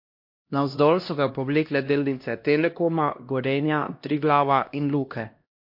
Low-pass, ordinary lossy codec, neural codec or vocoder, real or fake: 5.4 kHz; MP3, 32 kbps; codec, 16 kHz, 1 kbps, X-Codec, HuBERT features, trained on LibriSpeech; fake